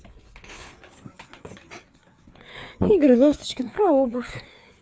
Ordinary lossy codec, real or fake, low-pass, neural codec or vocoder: none; fake; none; codec, 16 kHz, 4 kbps, FreqCodec, larger model